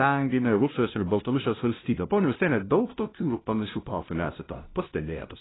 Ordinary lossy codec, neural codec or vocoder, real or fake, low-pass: AAC, 16 kbps; codec, 16 kHz, 0.5 kbps, FunCodec, trained on LibriTTS, 25 frames a second; fake; 7.2 kHz